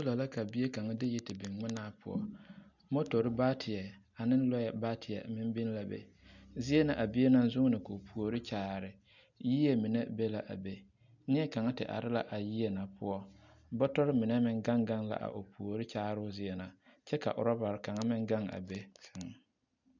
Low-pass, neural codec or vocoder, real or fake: 7.2 kHz; none; real